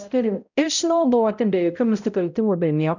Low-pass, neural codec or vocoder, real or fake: 7.2 kHz; codec, 16 kHz, 0.5 kbps, X-Codec, HuBERT features, trained on balanced general audio; fake